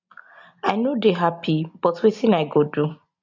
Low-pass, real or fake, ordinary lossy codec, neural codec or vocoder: 7.2 kHz; real; MP3, 64 kbps; none